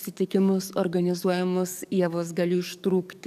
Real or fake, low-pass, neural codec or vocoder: fake; 14.4 kHz; codec, 44.1 kHz, 7.8 kbps, DAC